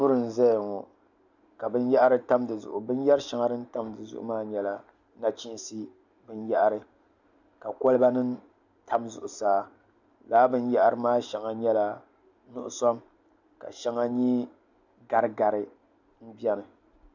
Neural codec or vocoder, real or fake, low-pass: none; real; 7.2 kHz